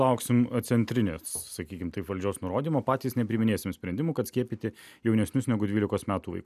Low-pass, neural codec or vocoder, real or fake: 14.4 kHz; none; real